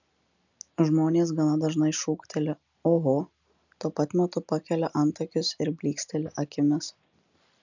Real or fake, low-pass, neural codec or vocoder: real; 7.2 kHz; none